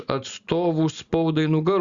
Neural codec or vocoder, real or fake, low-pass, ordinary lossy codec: none; real; 7.2 kHz; Opus, 64 kbps